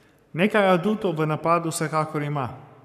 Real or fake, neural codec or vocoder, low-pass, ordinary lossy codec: fake; codec, 44.1 kHz, 7.8 kbps, Pupu-Codec; 14.4 kHz; none